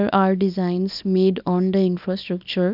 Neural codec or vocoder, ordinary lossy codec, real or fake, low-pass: codec, 24 kHz, 0.9 kbps, WavTokenizer, small release; none; fake; 5.4 kHz